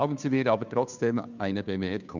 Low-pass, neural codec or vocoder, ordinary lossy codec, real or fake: 7.2 kHz; codec, 16 kHz, 2 kbps, FunCodec, trained on Chinese and English, 25 frames a second; none; fake